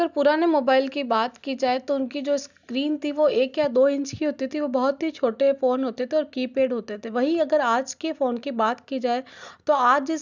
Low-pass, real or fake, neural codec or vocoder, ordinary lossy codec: 7.2 kHz; real; none; none